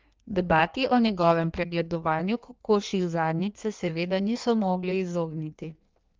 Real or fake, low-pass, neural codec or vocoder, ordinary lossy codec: fake; 7.2 kHz; codec, 16 kHz in and 24 kHz out, 1.1 kbps, FireRedTTS-2 codec; Opus, 32 kbps